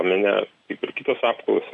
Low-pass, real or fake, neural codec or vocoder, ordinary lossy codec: 10.8 kHz; real; none; AAC, 64 kbps